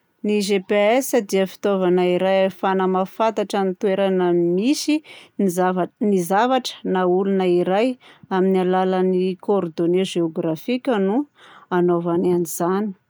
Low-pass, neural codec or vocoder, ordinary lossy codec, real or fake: none; none; none; real